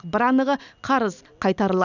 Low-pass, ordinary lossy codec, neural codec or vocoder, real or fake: 7.2 kHz; none; none; real